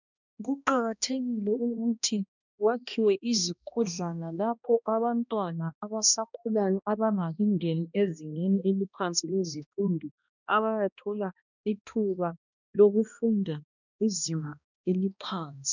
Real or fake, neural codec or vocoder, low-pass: fake; codec, 16 kHz, 1 kbps, X-Codec, HuBERT features, trained on balanced general audio; 7.2 kHz